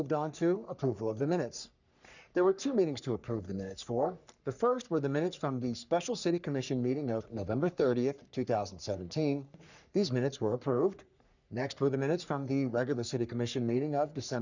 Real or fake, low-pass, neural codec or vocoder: fake; 7.2 kHz; codec, 44.1 kHz, 3.4 kbps, Pupu-Codec